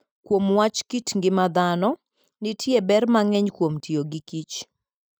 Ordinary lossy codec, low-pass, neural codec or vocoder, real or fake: none; none; none; real